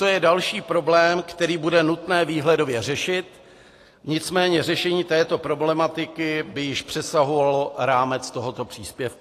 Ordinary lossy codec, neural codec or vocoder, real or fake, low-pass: AAC, 48 kbps; none; real; 14.4 kHz